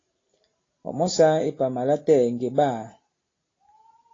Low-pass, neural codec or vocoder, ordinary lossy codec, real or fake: 7.2 kHz; none; AAC, 32 kbps; real